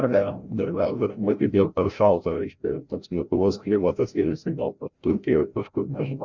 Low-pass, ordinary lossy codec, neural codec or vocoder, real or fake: 7.2 kHz; Opus, 64 kbps; codec, 16 kHz, 0.5 kbps, FreqCodec, larger model; fake